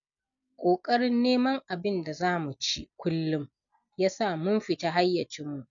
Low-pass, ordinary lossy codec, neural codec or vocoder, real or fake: 7.2 kHz; none; none; real